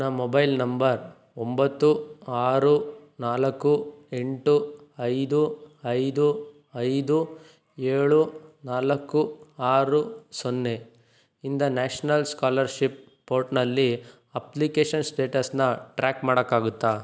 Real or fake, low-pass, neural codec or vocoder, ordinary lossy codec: real; none; none; none